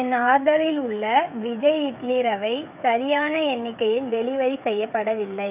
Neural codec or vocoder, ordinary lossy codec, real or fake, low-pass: codec, 16 kHz, 8 kbps, FreqCodec, smaller model; none; fake; 3.6 kHz